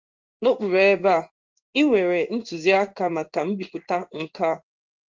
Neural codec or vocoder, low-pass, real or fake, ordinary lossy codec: codec, 16 kHz in and 24 kHz out, 1 kbps, XY-Tokenizer; 7.2 kHz; fake; Opus, 32 kbps